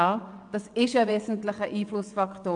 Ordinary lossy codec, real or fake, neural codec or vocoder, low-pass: AAC, 64 kbps; fake; vocoder, 22.05 kHz, 80 mel bands, WaveNeXt; 9.9 kHz